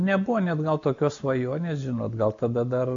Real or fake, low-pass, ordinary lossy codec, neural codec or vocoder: real; 7.2 kHz; MP3, 64 kbps; none